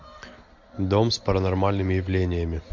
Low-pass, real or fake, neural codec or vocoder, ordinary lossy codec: 7.2 kHz; real; none; MP3, 48 kbps